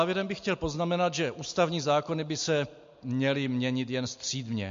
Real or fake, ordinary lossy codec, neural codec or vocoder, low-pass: real; MP3, 48 kbps; none; 7.2 kHz